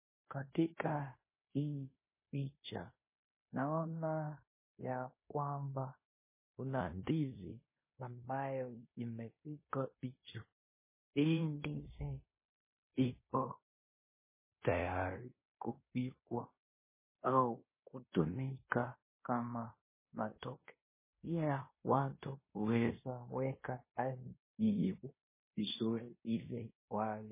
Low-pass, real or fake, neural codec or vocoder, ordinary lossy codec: 3.6 kHz; fake; codec, 16 kHz in and 24 kHz out, 0.9 kbps, LongCat-Audio-Codec, fine tuned four codebook decoder; MP3, 16 kbps